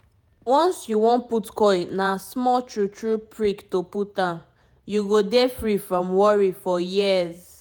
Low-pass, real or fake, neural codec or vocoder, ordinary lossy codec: none; fake; vocoder, 48 kHz, 128 mel bands, Vocos; none